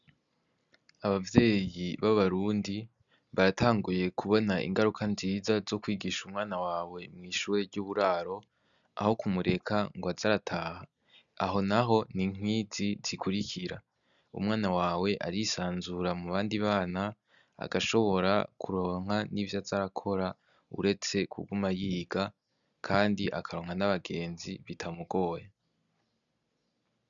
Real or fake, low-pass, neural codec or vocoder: real; 7.2 kHz; none